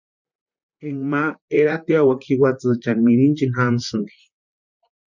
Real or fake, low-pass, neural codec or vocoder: fake; 7.2 kHz; vocoder, 44.1 kHz, 128 mel bands, Pupu-Vocoder